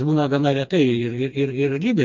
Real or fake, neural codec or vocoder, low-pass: fake; codec, 16 kHz, 2 kbps, FreqCodec, smaller model; 7.2 kHz